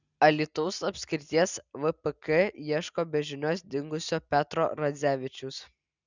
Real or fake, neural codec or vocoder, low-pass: real; none; 7.2 kHz